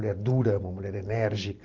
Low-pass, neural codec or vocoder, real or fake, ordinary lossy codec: 7.2 kHz; vocoder, 44.1 kHz, 128 mel bands every 512 samples, BigVGAN v2; fake; Opus, 24 kbps